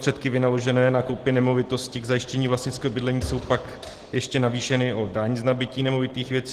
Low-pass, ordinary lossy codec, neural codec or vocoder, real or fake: 14.4 kHz; Opus, 16 kbps; none; real